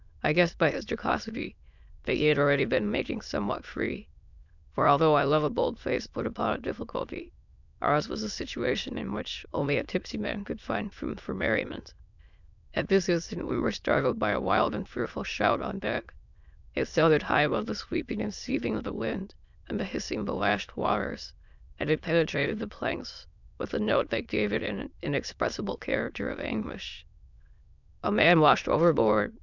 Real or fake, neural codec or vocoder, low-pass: fake; autoencoder, 22.05 kHz, a latent of 192 numbers a frame, VITS, trained on many speakers; 7.2 kHz